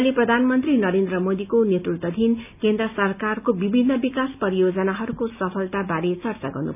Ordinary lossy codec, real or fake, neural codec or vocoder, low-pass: none; real; none; 3.6 kHz